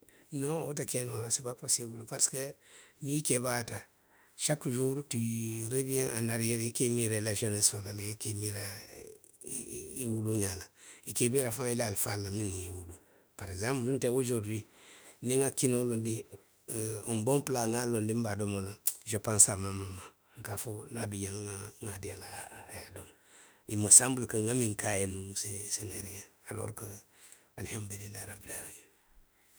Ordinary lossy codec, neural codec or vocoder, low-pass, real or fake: none; autoencoder, 48 kHz, 32 numbers a frame, DAC-VAE, trained on Japanese speech; none; fake